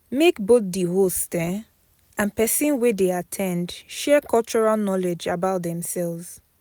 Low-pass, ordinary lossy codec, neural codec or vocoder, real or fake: none; none; none; real